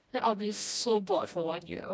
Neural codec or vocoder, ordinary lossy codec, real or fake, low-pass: codec, 16 kHz, 1 kbps, FreqCodec, smaller model; none; fake; none